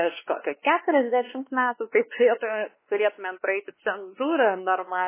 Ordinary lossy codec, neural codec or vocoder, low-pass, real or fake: MP3, 16 kbps; codec, 16 kHz, 2 kbps, X-Codec, WavLM features, trained on Multilingual LibriSpeech; 3.6 kHz; fake